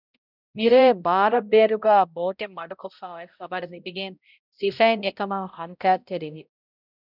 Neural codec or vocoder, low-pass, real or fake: codec, 16 kHz, 0.5 kbps, X-Codec, HuBERT features, trained on balanced general audio; 5.4 kHz; fake